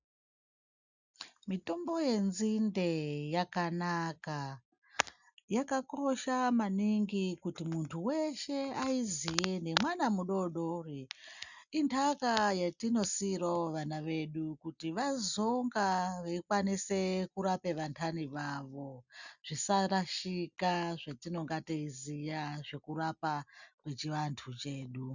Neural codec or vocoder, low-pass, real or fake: none; 7.2 kHz; real